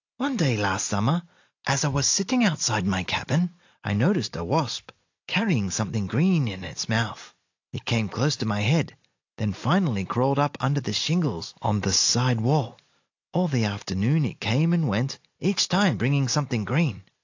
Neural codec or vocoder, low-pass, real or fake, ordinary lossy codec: none; 7.2 kHz; real; AAC, 48 kbps